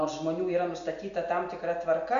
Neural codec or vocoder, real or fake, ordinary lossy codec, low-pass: none; real; Opus, 64 kbps; 7.2 kHz